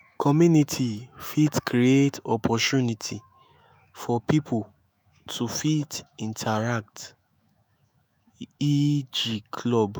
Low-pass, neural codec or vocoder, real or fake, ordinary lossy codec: none; autoencoder, 48 kHz, 128 numbers a frame, DAC-VAE, trained on Japanese speech; fake; none